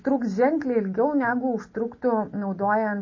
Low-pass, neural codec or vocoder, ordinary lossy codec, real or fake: 7.2 kHz; none; MP3, 32 kbps; real